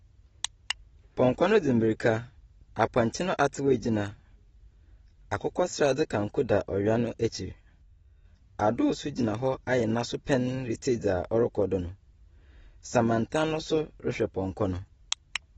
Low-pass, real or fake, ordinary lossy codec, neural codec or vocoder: 19.8 kHz; real; AAC, 24 kbps; none